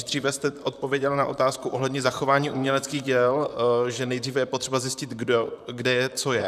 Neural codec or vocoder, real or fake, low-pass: vocoder, 44.1 kHz, 128 mel bands, Pupu-Vocoder; fake; 14.4 kHz